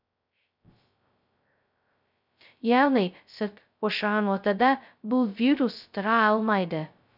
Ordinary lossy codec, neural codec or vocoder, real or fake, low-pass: none; codec, 16 kHz, 0.2 kbps, FocalCodec; fake; 5.4 kHz